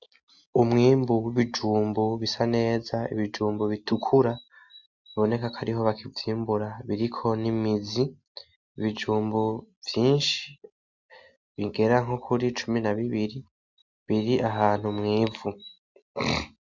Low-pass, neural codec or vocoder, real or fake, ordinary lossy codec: 7.2 kHz; none; real; MP3, 64 kbps